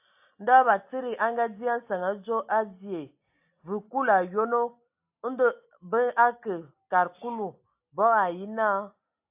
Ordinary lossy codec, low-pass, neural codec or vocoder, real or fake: AAC, 32 kbps; 3.6 kHz; none; real